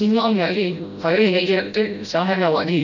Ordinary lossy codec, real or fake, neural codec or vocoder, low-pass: none; fake; codec, 16 kHz, 0.5 kbps, FreqCodec, smaller model; 7.2 kHz